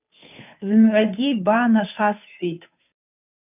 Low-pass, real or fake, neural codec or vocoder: 3.6 kHz; fake; codec, 16 kHz, 2 kbps, FunCodec, trained on Chinese and English, 25 frames a second